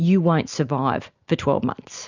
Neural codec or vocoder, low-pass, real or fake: none; 7.2 kHz; real